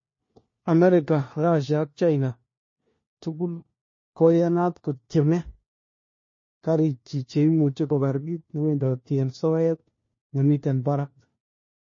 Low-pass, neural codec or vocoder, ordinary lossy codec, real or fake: 7.2 kHz; codec, 16 kHz, 1 kbps, FunCodec, trained on LibriTTS, 50 frames a second; MP3, 32 kbps; fake